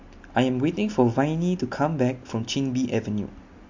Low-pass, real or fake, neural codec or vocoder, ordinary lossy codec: 7.2 kHz; real; none; MP3, 48 kbps